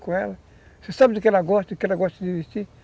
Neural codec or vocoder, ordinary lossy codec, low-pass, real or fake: none; none; none; real